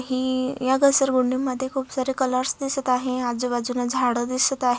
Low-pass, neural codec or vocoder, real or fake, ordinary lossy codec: none; none; real; none